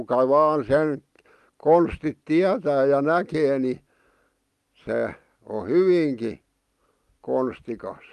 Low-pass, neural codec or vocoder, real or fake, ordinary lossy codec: 14.4 kHz; none; real; Opus, 32 kbps